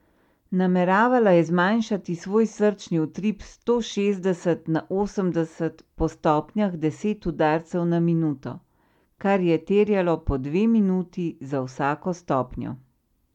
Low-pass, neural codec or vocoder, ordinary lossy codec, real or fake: 19.8 kHz; none; MP3, 96 kbps; real